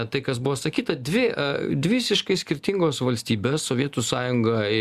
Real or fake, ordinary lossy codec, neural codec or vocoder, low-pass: real; Opus, 64 kbps; none; 14.4 kHz